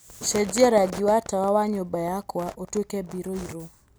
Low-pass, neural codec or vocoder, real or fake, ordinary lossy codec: none; none; real; none